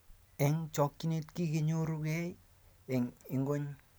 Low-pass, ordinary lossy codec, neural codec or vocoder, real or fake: none; none; none; real